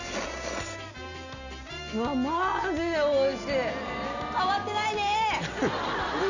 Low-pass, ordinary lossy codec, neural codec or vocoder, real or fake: 7.2 kHz; none; none; real